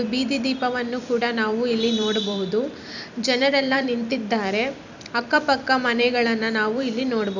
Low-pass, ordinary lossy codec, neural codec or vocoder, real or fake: 7.2 kHz; none; vocoder, 44.1 kHz, 128 mel bands every 256 samples, BigVGAN v2; fake